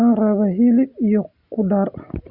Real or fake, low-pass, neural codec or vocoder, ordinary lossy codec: real; 5.4 kHz; none; Opus, 64 kbps